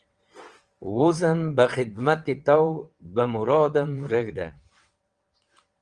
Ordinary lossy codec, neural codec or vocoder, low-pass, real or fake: Opus, 24 kbps; vocoder, 22.05 kHz, 80 mel bands, WaveNeXt; 9.9 kHz; fake